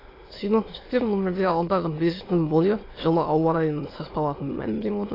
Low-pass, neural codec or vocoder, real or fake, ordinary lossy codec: 5.4 kHz; autoencoder, 22.05 kHz, a latent of 192 numbers a frame, VITS, trained on many speakers; fake; AAC, 24 kbps